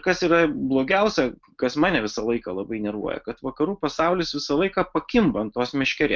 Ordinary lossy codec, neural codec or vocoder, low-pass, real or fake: Opus, 32 kbps; none; 7.2 kHz; real